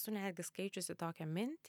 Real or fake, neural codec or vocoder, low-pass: real; none; 19.8 kHz